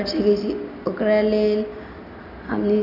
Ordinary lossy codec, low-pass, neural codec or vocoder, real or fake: none; 5.4 kHz; none; real